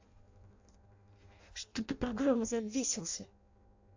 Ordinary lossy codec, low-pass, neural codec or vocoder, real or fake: AAC, 48 kbps; 7.2 kHz; codec, 16 kHz in and 24 kHz out, 0.6 kbps, FireRedTTS-2 codec; fake